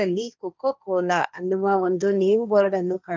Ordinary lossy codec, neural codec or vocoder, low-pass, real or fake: none; codec, 16 kHz, 1.1 kbps, Voila-Tokenizer; none; fake